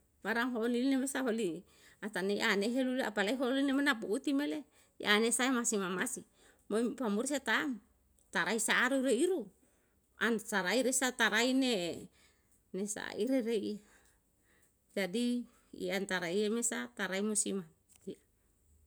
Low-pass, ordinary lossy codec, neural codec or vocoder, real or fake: none; none; none; real